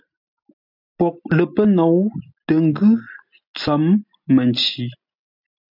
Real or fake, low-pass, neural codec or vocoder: real; 5.4 kHz; none